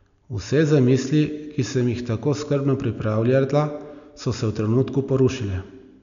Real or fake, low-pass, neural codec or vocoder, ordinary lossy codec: real; 7.2 kHz; none; none